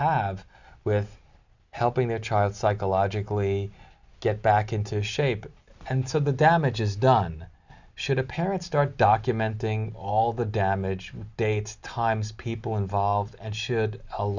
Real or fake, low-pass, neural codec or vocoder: real; 7.2 kHz; none